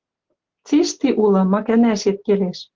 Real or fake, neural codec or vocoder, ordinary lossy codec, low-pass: real; none; Opus, 16 kbps; 7.2 kHz